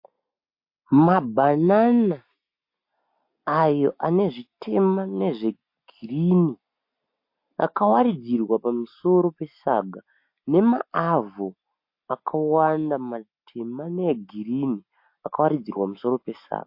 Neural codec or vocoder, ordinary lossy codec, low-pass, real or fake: none; MP3, 32 kbps; 5.4 kHz; real